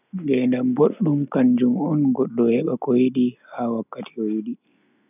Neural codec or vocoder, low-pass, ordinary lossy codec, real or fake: none; 3.6 kHz; none; real